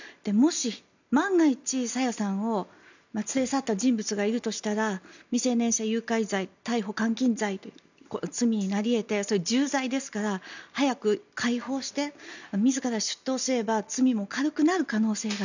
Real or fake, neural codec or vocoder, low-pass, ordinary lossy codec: real; none; 7.2 kHz; none